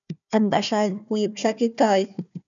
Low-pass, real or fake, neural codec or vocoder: 7.2 kHz; fake; codec, 16 kHz, 1 kbps, FunCodec, trained on Chinese and English, 50 frames a second